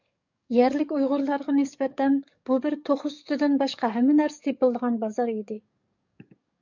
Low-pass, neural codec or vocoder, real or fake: 7.2 kHz; codec, 44.1 kHz, 7.8 kbps, DAC; fake